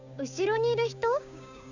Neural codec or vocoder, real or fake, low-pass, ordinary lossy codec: codec, 16 kHz, 6 kbps, DAC; fake; 7.2 kHz; none